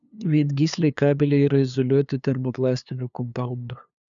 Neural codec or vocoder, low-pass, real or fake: codec, 16 kHz, 2 kbps, FunCodec, trained on LibriTTS, 25 frames a second; 7.2 kHz; fake